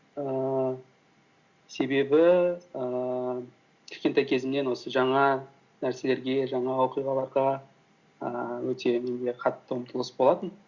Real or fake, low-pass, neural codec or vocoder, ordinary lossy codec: real; 7.2 kHz; none; Opus, 64 kbps